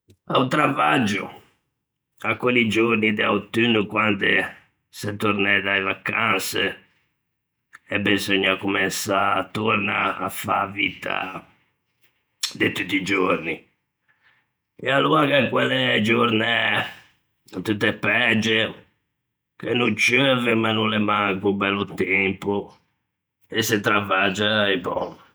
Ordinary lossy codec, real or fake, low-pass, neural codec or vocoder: none; fake; none; vocoder, 48 kHz, 128 mel bands, Vocos